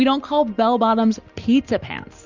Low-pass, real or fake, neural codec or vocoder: 7.2 kHz; real; none